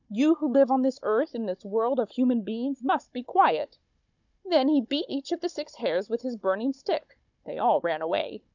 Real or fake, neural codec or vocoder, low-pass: fake; codec, 16 kHz, 16 kbps, FunCodec, trained on Chinese and English, 50 frames a second; 7.2 kHz